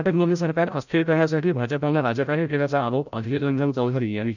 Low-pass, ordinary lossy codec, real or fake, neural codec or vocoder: 7.2 kHz; none; fake; codec, 16 kHz, 0.5 kbps, FreqCodec, larger model